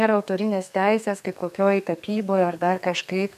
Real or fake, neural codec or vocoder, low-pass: fake; codec, 32 kHz, 1.9 kbps, SNAC; 14.4 kHz